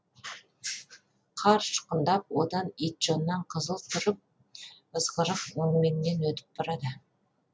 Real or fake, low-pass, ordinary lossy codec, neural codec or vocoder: real; none; none; none